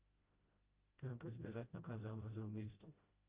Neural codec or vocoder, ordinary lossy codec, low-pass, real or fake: codec, 16 kHz, 0.5 kbps, FreqCodec, smaller model; Opus, 24 kbps; 3.6 kHz; fake